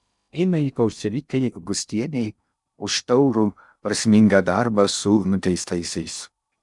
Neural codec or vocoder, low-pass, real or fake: codec, 16 kHz in and 24 kHz out, 0.6 kbps, FocalCodec, streaming, 2048 codes; 10.8 kHz; fake